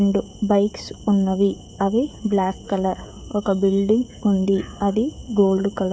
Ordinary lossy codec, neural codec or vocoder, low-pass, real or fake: none; codec, 16 kHz, 16 kbps, FreqCodec, smaller model; none; fake